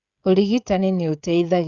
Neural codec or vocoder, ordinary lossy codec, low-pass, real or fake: codec, 16 kHz, 16 kbps, FreqCodec, smaller model; Opus, 64 kbps; 7.2 kHz; fake